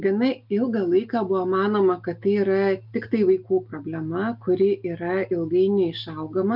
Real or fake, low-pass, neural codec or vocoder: real; 5.4 kHz; none